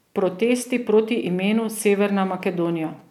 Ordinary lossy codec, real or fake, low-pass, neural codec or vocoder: none; real; 19.8 kHz; none